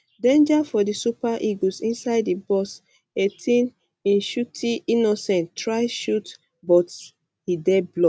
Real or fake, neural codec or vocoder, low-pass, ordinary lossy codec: real; none; none; none